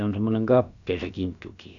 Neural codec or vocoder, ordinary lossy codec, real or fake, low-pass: codec, 16 kHz, about 1 kbps, DyCAST, with the encoder's durations; none; fake; 7.2 kHz